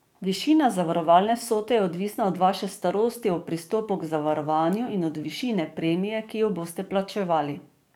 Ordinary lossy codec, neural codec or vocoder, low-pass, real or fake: none; codec, 44.1 kHz, 7.8 kbps, DAC; 19.8 kHz; fake